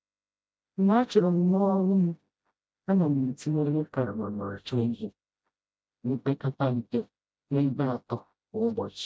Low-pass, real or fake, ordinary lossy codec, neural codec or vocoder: none; fake; none; codec, 16 kHz, 0.5 kbps, FreqCodec, smaller model